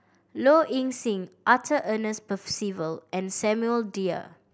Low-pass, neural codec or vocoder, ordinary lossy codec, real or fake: none; none; none; real